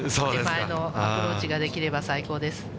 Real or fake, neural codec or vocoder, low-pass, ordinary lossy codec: real; none; none; none